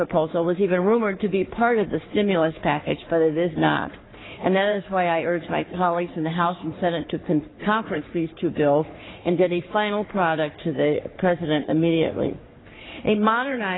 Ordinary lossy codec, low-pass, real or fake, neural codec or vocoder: AAC, 16 kbps; 7.2 kHz; fake; codec, 44.1 kHz, 3.4 kbps, Pupu-Codec